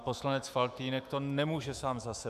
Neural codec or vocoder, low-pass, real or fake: autoencoder, 48 kHz, 128 numbers a frame, DAC-VAE, trained on Japanese speech; 14.4 kHz; fake